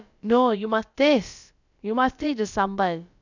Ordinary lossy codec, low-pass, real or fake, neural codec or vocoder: none; 7.2 kHz; fake; codec, 16 kHz, about 1 kbps, DyCAST, with the encoder's durations